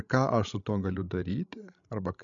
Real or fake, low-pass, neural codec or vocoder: fake; 7.2 kHz; codec, 16 kHz, 16 kbps, FreqCodec, larger model